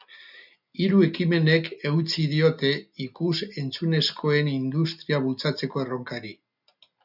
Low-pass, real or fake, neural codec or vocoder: 5.4 kHz; real; none